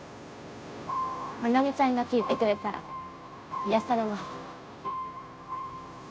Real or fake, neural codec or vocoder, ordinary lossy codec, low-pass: fake; codec, 16 kHz, 0.5 kbps, FunCodec, trained on Chinese and English, 25 frames a second; none; none